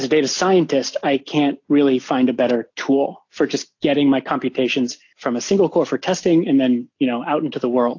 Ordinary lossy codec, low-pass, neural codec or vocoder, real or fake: AAC, 48 kbps; 7.2 kHz; none; real